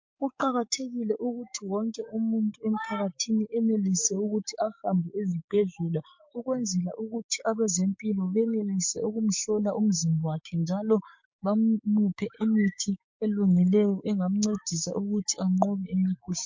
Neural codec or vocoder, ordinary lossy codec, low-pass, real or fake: codec, 16 kHz, 6 kbps, DAC; MP3, 48 kbps; 7.2 kHz; fake